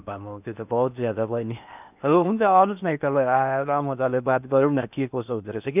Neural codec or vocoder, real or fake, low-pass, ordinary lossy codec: codec, 16 kHz in and 24 kHz out, 0.8 kbps, FocalCodec, streaming, 65536 codes; fake; 3.6 kHz; none